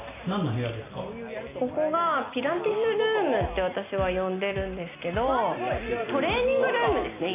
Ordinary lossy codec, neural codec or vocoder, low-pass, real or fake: AAC, 24 kbps; none; 3.6 kHz; real